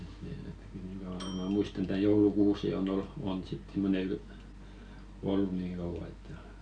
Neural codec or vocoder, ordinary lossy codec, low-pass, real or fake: none; none; 9.9 kHz; real